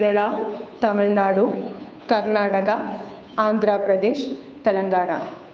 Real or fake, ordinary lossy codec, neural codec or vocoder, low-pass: fake; none; codec, 16 kHz, 2 kbps, FunCodec, trained on Chinese and English, 25 frames a second; none